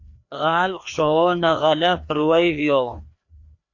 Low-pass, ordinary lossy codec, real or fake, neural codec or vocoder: 7.2 kHz; AAC, 48 kbps; fake; codec, 16 kHz, 2 kbps, FreqCodec, larger model